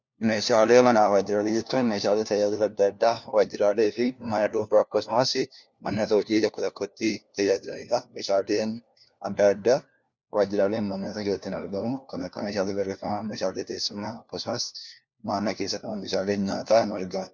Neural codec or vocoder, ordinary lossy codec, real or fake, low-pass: codec, 16 kHz, 1 kbps, FunCodec, trained on LibriTTS, 50 frames a second; Opus, 64 kbps; fake; 7.2 kHz